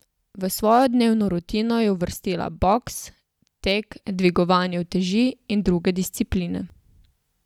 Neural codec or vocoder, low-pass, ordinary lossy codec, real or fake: none; 19.8 kHz; none; real